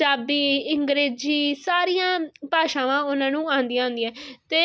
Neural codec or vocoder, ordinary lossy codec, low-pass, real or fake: none; none; none; real